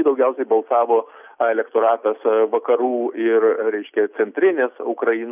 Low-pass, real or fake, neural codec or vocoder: 3.6 kHz; real; none